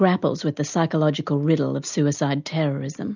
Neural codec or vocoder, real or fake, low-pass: none; real; 7.2 kHz